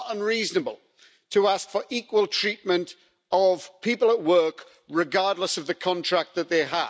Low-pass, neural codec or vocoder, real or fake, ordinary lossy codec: none; none; real; none